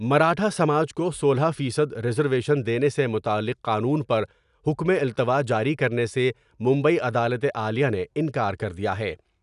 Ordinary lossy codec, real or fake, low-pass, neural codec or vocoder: none; real; 10.8 kHz; none